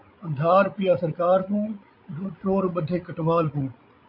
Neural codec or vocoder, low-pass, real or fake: codec, 16 kHz, 16 kbps, FreqCodec, larger model; 5.4 kHz; fake